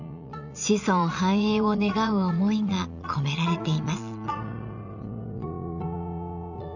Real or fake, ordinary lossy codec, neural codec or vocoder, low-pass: fake; none; vocoder, 22.05 kHz, 80 mel bands, Vocos; 7.2 kHz